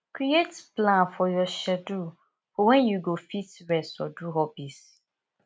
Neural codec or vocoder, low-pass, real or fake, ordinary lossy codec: none; none; real; none